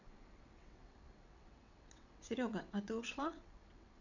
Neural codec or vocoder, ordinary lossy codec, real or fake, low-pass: vocoder, 22.05 kHz, 80 mel bands, WaveNeXt; none; fake; 7.2 kHz